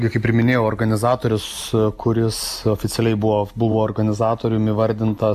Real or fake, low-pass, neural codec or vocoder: real; 14.4 kHz; none